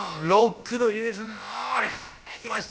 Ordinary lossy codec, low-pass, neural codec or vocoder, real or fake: none; none; codec, 16 kHz, about 1 kbps, DyCAST, with the encoder's durations; fake